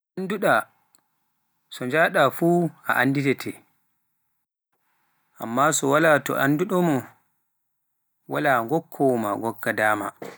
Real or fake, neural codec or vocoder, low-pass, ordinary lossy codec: real; none; none; none